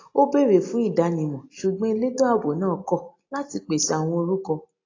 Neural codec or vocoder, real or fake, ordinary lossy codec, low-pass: none; real; AAC, 32 kbps; 7.2 kHz